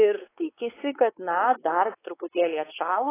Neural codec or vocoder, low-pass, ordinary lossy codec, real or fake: codec, 16 kHz, 4 kbps, X-Codec, WavLM features, trained on Multilingual LibriSpeech; 3.6 kHz; AAC, 16 kbps; fake